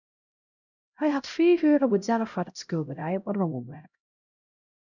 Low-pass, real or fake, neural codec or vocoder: 7.2 kHz; fake; codec, 16 kHz, 0.5 kbps, X-Codec, HuBERT features, trained on LibriSpeech